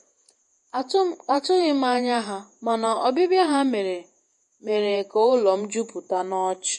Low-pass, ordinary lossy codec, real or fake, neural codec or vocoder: 14.4 kHz; MP3, 48 kbps; fake; vocoder, 48 kHz, 128 mel bands, Vocos